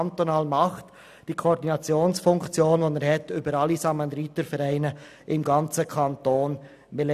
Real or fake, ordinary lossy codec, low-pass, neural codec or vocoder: real; none; 14.4 kHz; none